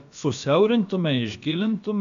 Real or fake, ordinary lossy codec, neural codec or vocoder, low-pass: fake; AAC, 96 kbps; codec, 16 kHz, about 1 kbps, DyCAST, with the encoder's durations; 7.2 kHz